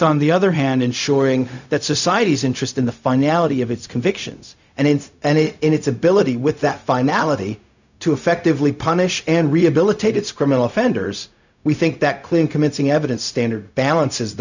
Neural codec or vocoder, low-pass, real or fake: codec, 16 kHz, 0.4 kbps, LongCat-Audio-Codec; 7.2 kHz; fake